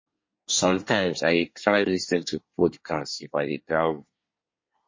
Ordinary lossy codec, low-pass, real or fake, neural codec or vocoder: MP3, 32 kbps; 7.2 kHz; fake; codec, 24 kHz, 1 kbps, SNAC